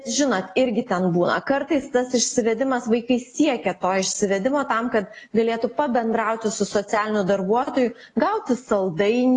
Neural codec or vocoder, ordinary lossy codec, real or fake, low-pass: none; AAC, 32 kbps; real; 10.8 kHz